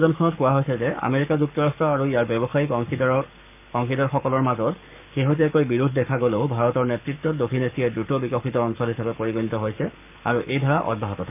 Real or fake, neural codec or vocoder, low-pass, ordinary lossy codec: fake; codec, 44.1 kHz, 7.8 kbps, Pupu-Codec; 3.6 kHz; none